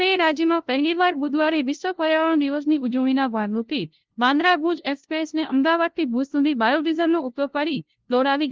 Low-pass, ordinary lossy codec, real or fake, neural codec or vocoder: 7.2 kHz; Opus, 16 kbps; fake; codec, 16 kHz, 0.5 kbps, FunCodec, trained on LibriTTS, 25 frames a second